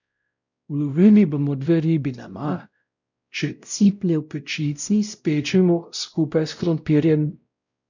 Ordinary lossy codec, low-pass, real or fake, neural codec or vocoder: none; 7.2 kHz; fake; codec, 16 kHz, 0.5 kbps, X-Codec, WavLM features, trained on Multilingual LibriSpeech